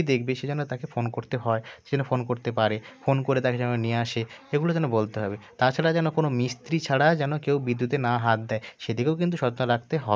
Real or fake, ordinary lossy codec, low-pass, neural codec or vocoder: real; none; none; none